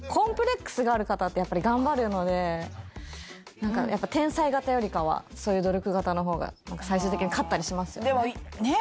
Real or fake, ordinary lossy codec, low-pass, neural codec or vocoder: real; none; none; none